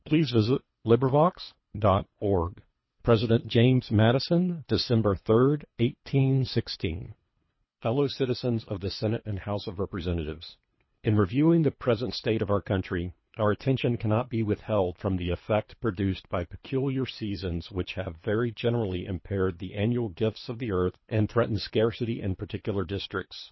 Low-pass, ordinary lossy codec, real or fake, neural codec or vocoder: 7.2 kHz; MP3, 24 kbps; fake; codec, 24 kHz, 3 kbps, HILCodec